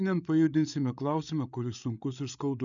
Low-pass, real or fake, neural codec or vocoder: 7.2 kHz; fake; codec, 16 kHz, 8 kbps, FreqCodec, larger model